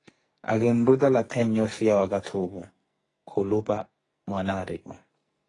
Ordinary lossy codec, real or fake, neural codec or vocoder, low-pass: AAC, 32 kbps; fake; codec, 44.1 kHz, 2.6 kbps, SNAC; 10.8 kHz